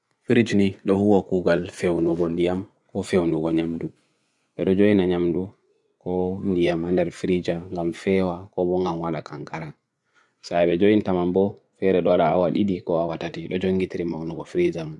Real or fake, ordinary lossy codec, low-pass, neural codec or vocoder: fake; none; 10.8 kHz; vocoder, 24 kHz, 100 mel bands, Vocos